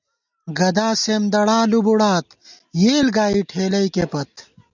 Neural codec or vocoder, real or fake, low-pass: none; real; 7.2 kHz